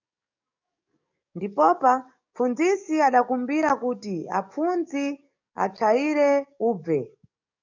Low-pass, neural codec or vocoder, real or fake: 7.2 kHz; codec, 44.1 kHz, 7.8 kbps, DAC; fake